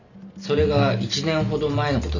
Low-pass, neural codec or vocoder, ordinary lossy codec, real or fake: 7.2 kHz; none; none; real